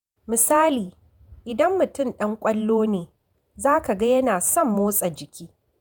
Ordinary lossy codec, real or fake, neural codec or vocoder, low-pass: none; fake; vocoder, 48 kHz, 128 mel bands, Vocos; none